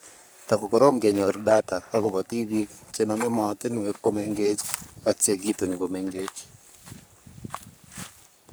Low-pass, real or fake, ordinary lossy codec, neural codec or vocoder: none; fake; none; codec, 44.1 kHz, 3.4 kbps, Pupu-Codec